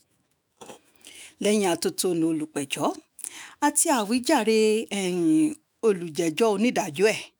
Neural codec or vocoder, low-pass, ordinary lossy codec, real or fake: autoencoder, 48 kHz, 128 numbers a frame, DAC-VAE, trained on Japanese speech; none; none; fake